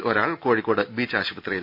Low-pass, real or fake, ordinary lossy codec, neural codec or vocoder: 5.4 kHz; real; none; none